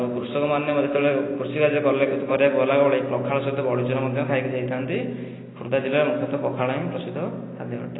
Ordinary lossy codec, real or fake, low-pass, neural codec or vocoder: AAC, 16 kbps; real; 7.2 kHz; none